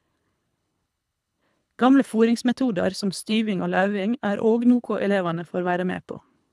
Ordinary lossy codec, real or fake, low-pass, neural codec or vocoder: none; fake; none; codec, 24 kHz, 3 kbps, HILCodec